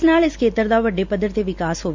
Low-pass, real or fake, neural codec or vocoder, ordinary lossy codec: 7.2 kHz; real; none; MP3, 64 kbps